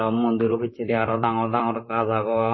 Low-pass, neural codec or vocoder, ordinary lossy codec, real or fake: 7.2 kHz; vocoder, 44.1 kHz, 128 mel bands, Pupu-Vocoder; MP3, 24 kbps; fake